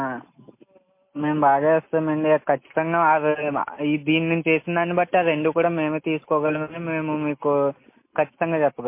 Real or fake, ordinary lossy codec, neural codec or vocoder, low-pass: real; MP3, 24 kbps; none; 3.6 kHz